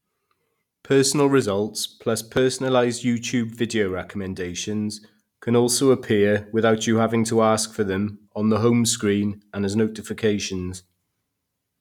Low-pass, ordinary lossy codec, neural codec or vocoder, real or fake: 19.8 kHz; none; none; real